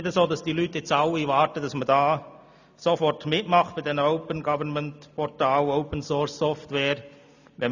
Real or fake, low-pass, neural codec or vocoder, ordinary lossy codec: real; 7.2 kHz; none; none